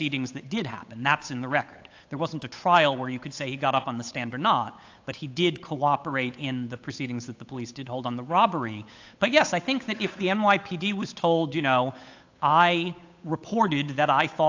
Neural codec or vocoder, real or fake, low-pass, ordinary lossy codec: codec, 16 kHz, 8 kbps, FunCodec, trained on Chinese and English, 25 frames a second; fake; 7.2 kHz; AAC, 48 kbps